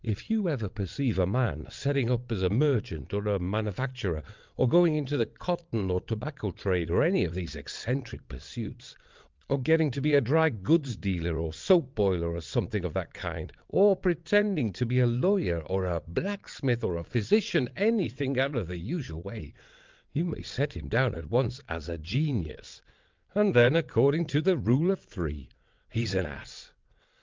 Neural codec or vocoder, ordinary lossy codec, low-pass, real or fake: vocoder, 22.05 kHz, 80 mel bands, WaveNeXt; Opus, 24 kbps; 7.2 kHz; fake